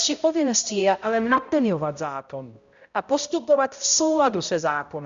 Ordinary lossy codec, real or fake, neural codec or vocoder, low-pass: Opus, 64 kbps; fake; codec, 16 kHz, 0.5 kbps, X-Codec, HuBERT features, trained on balanced general audio; 7.2 kHz